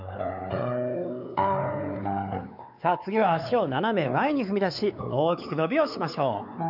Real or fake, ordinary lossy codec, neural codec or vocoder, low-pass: fake; none; codec, 16 kHz, 4 kbps, X-Codec, WavLM features, trained on Multilingual LibriSpeech; 5.4 kHz